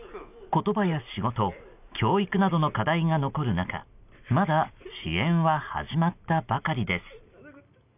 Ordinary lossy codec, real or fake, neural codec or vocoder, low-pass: none; real; none; 3.6 kHz